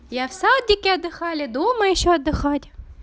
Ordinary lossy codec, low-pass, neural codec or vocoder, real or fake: none; none; none; real